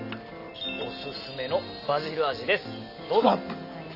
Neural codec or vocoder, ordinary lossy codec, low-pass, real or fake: none; none; 5.4 kHz; real